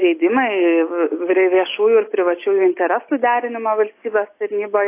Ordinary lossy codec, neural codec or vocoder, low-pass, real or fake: AAC, 24 kbps; none; 3.6 kHz; real